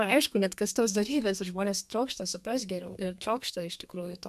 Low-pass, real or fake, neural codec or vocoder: 14.4 kHz; fake; codec, 32 kHz, 1.9 kbps, SNAC